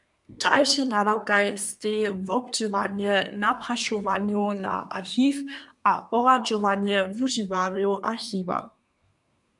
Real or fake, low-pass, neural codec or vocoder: fake; 10.8 kHz; codec, 24 kHz, 1 kbps, SNAC